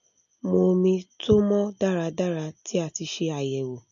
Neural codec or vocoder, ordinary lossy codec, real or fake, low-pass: none; none; real; 7.2 kHz